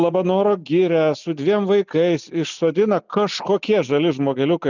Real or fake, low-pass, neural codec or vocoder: real; 7.2 kHz; none